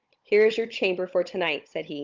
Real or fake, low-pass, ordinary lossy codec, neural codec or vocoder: fake; 7.2 kHz; Opus, 32 kbps; codec, 16 kHz, 16 kbps, FunCodec, trained on Chinese and English, 50 frames a second